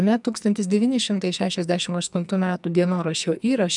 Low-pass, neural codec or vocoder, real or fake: 10.8 kHz; codec, 44.1 kHz, 2.6 kbps, SNAC; fake